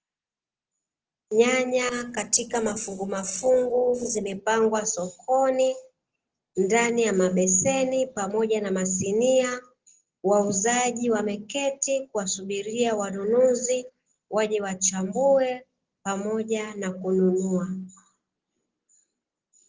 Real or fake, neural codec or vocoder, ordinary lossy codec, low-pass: real; none; Opus, 16 kbps; 7.2 kHz